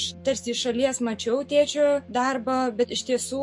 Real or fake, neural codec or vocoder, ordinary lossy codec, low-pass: fake; autoencoder, 48 kHz, 128 numbers a frame, DAC-VAE, trained on Japanese speech; MP3, 48 kbps; 10.8 kHz